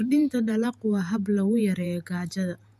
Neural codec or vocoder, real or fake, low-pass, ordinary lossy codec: vocoder, 48 kHz, 128 mel bands, Vocos; fake; 14.4 kHz; none